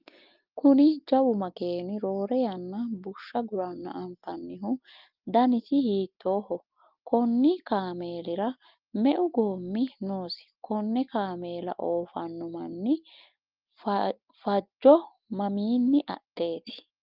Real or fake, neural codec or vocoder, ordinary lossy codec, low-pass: real; none; Opus, 32 kbps; 5.4 kHz